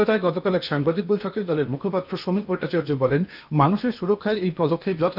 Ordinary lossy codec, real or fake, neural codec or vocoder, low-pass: none; fake; codec, 16 kHz in and 24 kHz out, 0.8 kbps, FocalCodec, streaming, 65536 codes; 5.4 kHz